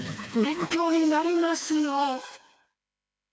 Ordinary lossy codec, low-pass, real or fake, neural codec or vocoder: none; none; fake; codec, 16 kHz, 2 kbps, FreqCodec, smaller model